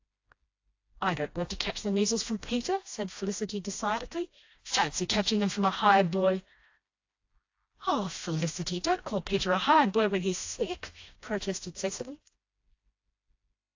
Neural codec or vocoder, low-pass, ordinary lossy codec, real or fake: codec, 16 kHz, 1 kbps, FreqCodec, smaller model; 7.2 kHz; AAC, 48 kbps; fake